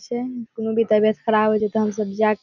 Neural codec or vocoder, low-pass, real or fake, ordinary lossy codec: none; 7.2 kHz; real; none